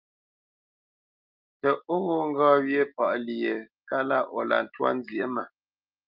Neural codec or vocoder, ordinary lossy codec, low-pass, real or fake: none; Opus, 24 kbps; 5.4 kHz; real